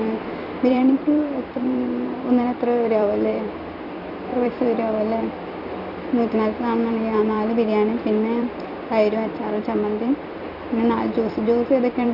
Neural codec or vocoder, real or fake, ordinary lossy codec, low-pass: vocoder, 44.1 kHz, 128 mel bands every 256 samples, BigVGAN v2; fake; none; 5.4 kHz